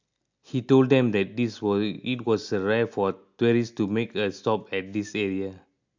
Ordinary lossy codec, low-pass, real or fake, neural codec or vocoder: MP3, 64 kbps; 7.2 kHz; real; none